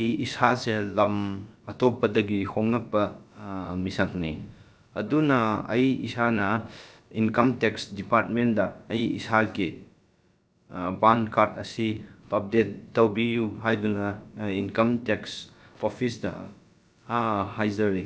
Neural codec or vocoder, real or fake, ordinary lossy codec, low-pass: codec, 16 kHz, about 1 kbps, DyCAST, with the encoder's durations; fake; none; none